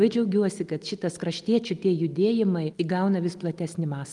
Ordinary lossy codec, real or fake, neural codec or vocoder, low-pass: Opus, 32 kbps; real; none; 10.8 kHz